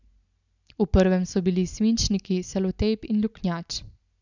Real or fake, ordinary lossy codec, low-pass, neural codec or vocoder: real; none; 7.2 kHz; none